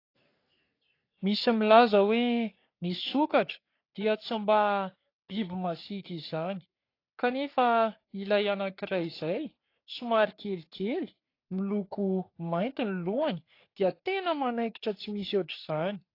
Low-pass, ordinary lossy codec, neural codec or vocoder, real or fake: 5.4 kHz; AAC, 32 kbps; codec, 44.1 kHz, 3.4 kbps, Pupu-Codec; fake